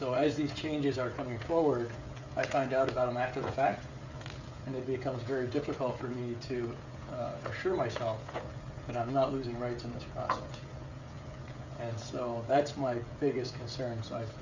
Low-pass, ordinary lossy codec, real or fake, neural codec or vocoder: 7.2 kHz; Opus, 64 kbps; fake; codec, 16 kHz, 16 kbps, FreqCodec, smaller model